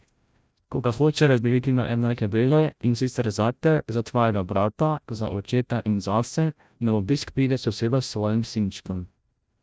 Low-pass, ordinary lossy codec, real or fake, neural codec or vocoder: none; none; fake; codec, 16 kHz, 0.5 kbps, FreqCodec, larger model